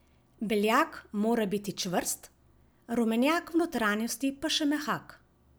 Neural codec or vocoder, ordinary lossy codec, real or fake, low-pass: none; none; real; none